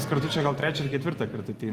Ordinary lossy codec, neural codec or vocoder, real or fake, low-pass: Opus, 32 kbps; vocoder, 44.1 kHz, 128 mel bands every 512 samples, BigVGAN v2; fake; 14.4 kHz